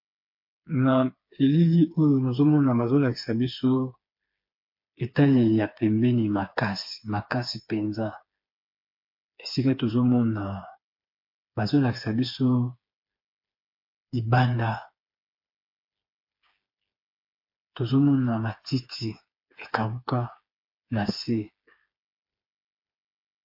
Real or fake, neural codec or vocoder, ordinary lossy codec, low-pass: fake; codec, 16 kHz, 4 kbps, FreqCodec, smaller model; MP3, 32 kbps; 5.4 kHz